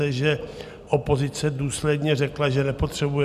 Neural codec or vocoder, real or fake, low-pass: none; real; 14.4 kHz